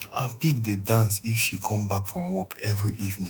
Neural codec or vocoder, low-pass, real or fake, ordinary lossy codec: autoencoder, 48 kHz, 32 numbers a frame, DAC-VAE, trained on Japanese speech; none; fake; none